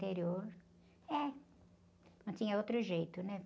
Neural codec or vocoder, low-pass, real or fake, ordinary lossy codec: none; none; real; none